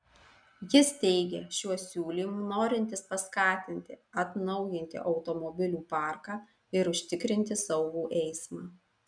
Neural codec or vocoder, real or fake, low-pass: none; real; 9.9 kHz